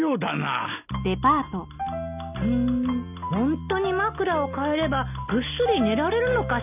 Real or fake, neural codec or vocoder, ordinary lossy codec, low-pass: real; none; none; 3.6 kHz